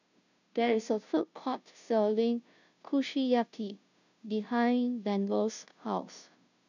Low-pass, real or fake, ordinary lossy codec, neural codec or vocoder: 7.2 kHz; fake; none; codec, 16 kHz, 0.5 kbps, FunCodec, trained on Chinese and English, 25 frames a second